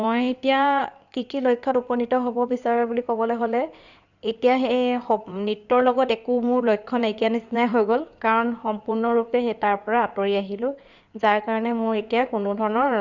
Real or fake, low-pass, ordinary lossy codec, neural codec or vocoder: fake; 7.2 kHz; none; codec, 16 kHz in and 24 kHz out, 2.2 kbps, FireRedTTS-2 codec